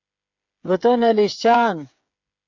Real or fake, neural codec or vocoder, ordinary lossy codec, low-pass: fake; codec, 16 kHz, 8 kbps, FreqCodec, smaller model; MP3, 64 kbps; 7.2 kHz